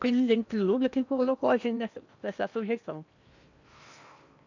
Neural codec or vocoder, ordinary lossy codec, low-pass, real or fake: codec, 16 kHz in and 24 kHz out, 0.8 kbps, FocalCodec, streaming, 65536 codes; none; 7.2 kHz; fake